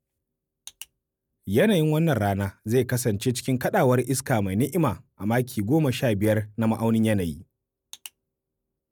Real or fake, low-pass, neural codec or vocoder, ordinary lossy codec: real; none; none; none